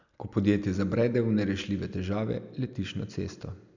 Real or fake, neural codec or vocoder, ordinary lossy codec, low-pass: real; none; none; 7.2 kHz